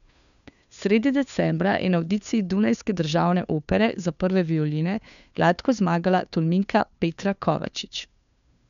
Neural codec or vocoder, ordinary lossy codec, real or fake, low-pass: codec, 16 kHz, 2 kbps, FunCodec, trained on Chinese and English, 25 frames a second; none; fake; 7.2 kHz